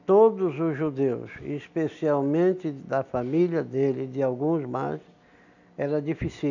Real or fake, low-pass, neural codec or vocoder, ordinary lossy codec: real; 7.2 kHz; none; none